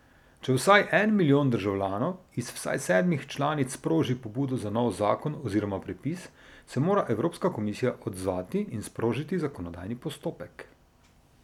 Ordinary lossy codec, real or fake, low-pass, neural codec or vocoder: none; real; 19.8 kHz; none